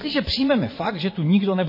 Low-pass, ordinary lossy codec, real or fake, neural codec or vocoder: 5.4 kHz; MP3, 24 kbps; fake; vocoder, 22.05 kHz, 80 mel bands, Vocos